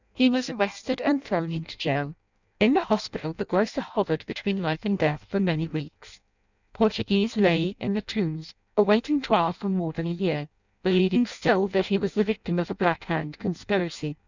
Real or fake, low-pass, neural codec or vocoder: fake; 7.2 kHz; codec, 16 kHz in and 24 kHz out, 0.6 kbps, FireRedTTS-2 codec